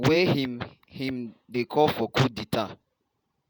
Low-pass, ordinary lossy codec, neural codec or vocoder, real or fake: none; none; vocoder, 48 kHz, 128 mel bands, Vocos; fake